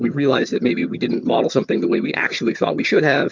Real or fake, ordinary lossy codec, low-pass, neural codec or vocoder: fake; MP3, 64 kbps; 7.2 kHz; vocoder, 22.05 kHz, 80 mel bands, HiFi-GAN